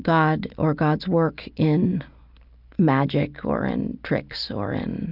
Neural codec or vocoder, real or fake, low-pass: none; real; 5.4 kHz